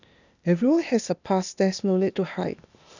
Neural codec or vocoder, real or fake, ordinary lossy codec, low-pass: codec, 16 kHz, 0.8 kbps, ZipCodec; fake; none; 7.2 kHz